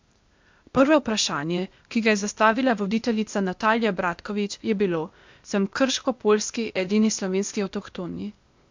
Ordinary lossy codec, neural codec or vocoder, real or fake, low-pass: MP3, 64 kbps; codec, 16 kHz, 0.8 kbps, ZipCodec; fake; 7.2 kHz